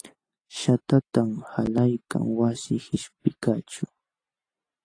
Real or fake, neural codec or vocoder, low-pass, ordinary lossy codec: real; none; 9.9 kHz; AAC, 32 kbps